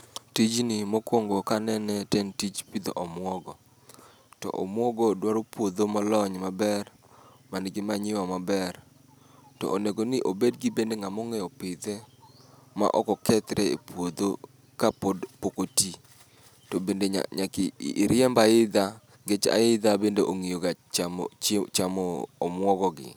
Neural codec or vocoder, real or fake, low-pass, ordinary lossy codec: none; real; none; none